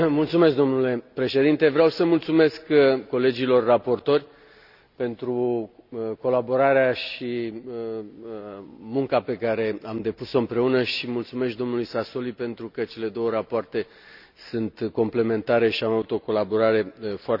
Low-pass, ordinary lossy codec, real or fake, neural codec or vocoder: 5.4 kHz; none; real; none